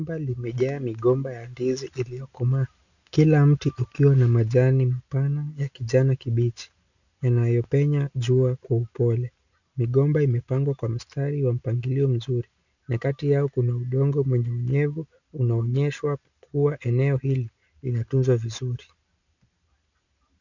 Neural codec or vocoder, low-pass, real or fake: none; 7.2 kHz; real